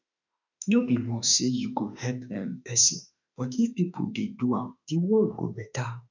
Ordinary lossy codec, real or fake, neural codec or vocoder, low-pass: none; fake; autoencoder, 48 kHz, 32 numbers a frame, DAC-VAE, trained on Japanese speech; 7.2 kHz